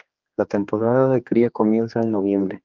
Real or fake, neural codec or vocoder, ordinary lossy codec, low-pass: fake; codec, 16 kHz, 2 kbps, X-Codec, HuBERT features, trained on general audio; Opus, 24 kbps; 7.2 kHz